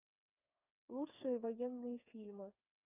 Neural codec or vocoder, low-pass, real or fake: codec, 16 kHz, 4 kbps, FreqCodec, smaller model; 3.6 kHz; fake